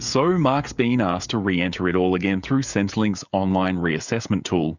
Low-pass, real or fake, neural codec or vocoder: 7.2 kHz; fake; codec, 16 kHz, 16 kbps, FreqCodec, smaller model